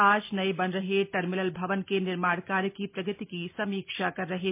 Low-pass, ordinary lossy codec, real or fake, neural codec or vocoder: 3.6 kHz; MP3, 24 kbps; real; none